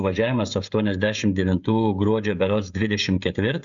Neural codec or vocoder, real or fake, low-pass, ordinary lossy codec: codec, 16 kHz, 16 kbps, FreqCodec, smaller model; fake; 7.2 kHz; Opus, 64 kbps